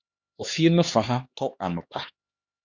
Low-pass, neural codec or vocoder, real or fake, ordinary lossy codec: 7.2 kHz; codec, 16 kHz, 2 kbps, X-Codec, HuBERT features, trained on LibriSpeech; fake; Opus, 64 kbps